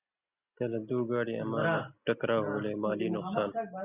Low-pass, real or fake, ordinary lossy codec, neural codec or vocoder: 3.6 kHz; real; Opus, 64 kbps; none